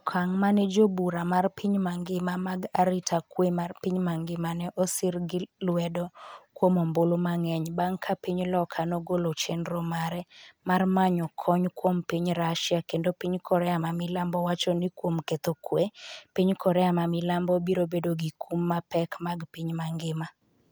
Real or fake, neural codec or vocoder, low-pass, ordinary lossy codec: real; none; none; none